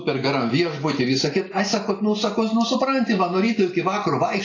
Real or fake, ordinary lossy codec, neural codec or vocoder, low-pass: real; AAC, 32 kbps; none; 7.2 kHz